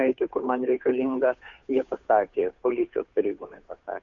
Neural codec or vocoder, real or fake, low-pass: codec, 16 kHz, 2 kbps, FunCodec, trained on Chinese and English, 25 frames a second; fake; 7.2 kHz